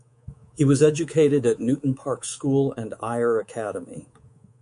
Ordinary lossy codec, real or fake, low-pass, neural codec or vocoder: MP3, 64 kbps; fake; 10.8 kHz; codec, 24 kHz, 3.1 kbps, DualCodec